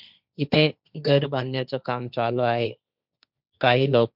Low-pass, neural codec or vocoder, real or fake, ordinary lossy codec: 5.4 kHz; codec, 16 kHz, 1.1 kbps, Voila-Tokenizer; fake; none